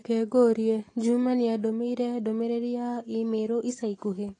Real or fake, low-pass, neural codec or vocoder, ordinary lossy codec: real; 10.8 kHz; none; AAC, 32 kbps